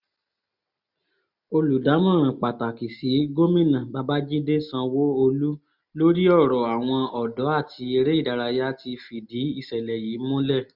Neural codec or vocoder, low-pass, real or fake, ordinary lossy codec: none; 5.4 kHz; real; none